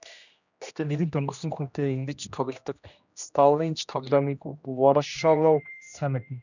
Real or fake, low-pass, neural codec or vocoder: fake; 7.2 kHz; codec, 16 kHz, 1 kbps, X-Codec, HuBERT features, trained on general audio